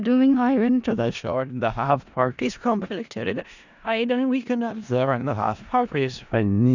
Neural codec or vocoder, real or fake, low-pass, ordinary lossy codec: codec, 16 kHz in and 24 kHz out, 0.4 kbps, LongCat-Audio-Codec, four codebook decoder; fake; 7.2 kHz; none